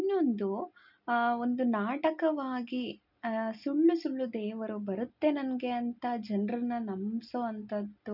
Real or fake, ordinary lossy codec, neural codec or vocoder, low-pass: real; none; none; 5.4 kHz